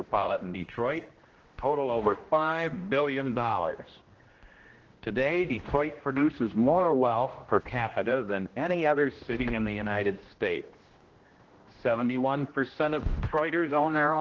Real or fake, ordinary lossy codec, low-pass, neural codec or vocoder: fake; Opus, 16 kbps; 7.2 kHz; codec, 16 kHz, 1 kbps, X-Codec, HuBERT features, trained on general audio